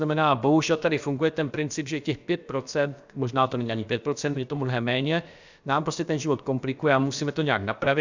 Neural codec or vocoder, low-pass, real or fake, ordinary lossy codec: codec, 16 kHz, about 1 kbps, DyCAST, with the encoder's durations; 7.2 kHz; fake; Opus, 64 kbps